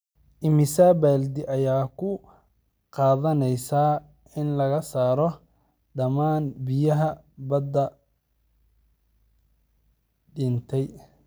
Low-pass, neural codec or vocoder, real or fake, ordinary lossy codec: none; none; real; none